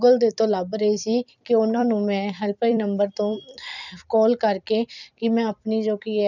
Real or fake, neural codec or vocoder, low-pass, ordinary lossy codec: fake; vocoder, 44.1 kHz, 128 mel bands every 256 samples, BigVGAN v2; 7.2 kHz; none